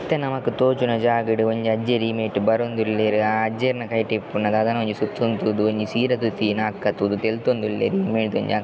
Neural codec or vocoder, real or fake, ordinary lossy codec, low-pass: none; real; none; none